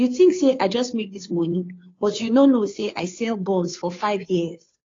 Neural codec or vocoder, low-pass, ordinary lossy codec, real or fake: codec, 16 kHz, 2 kbps, FunCodec, trained on Chinese and English, 25 frames a second; 7.2 kHz; AAC, 32 kbps; fake